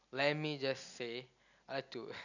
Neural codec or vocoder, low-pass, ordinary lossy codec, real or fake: none; 7.2 kHz; none; real